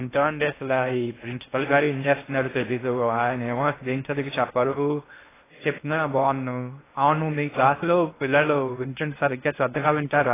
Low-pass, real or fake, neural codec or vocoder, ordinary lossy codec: 3.6 kHz; fake; codec, 16 kHz in and 24 kHz out, 0.6 kbps, FocalCodec, streaming, 4096 codes; AAC, 16 kbps